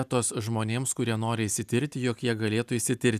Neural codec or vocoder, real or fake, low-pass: none; real; 14.4 kHz